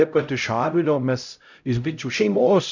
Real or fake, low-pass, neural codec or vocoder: fake; 7.2 kHz; codec, 16 kHz, 0.5 kbps, X-Codec, HuBERT features, trained on LibriSpeech